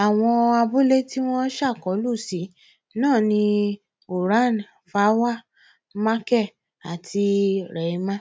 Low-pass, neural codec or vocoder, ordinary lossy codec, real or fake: none; none; none; real